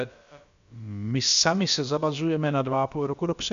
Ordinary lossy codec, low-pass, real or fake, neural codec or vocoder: MP3, 64 kbps; 7.2 kHz; fake; codec, 16 kHz, about 1 kbps, DyCAST, with the encoder's durations